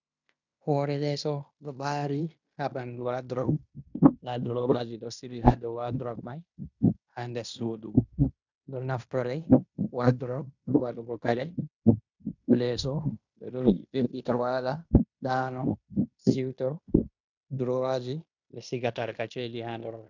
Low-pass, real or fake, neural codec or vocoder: 7.2 kHz; fake; codec, 16 kHz in and 24 kHz out, 0.9 kbps, LongCat-Audio-Codec, fine tuned four codebook decoder